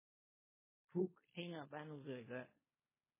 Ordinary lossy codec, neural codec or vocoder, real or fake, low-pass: MP3, 16 kbps; codec, 16 kHz in and 24 kHz out, 0.4 kbps, LongCat-Audio-Codec, fine tuned four codebook decoder; fake; 3.6 kHz